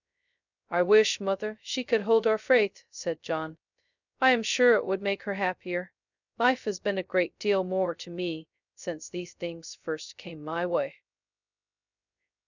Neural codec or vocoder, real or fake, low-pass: codec, 16 kHz, 0.2 kbps, FocalCodec; fake; 7.2 kHz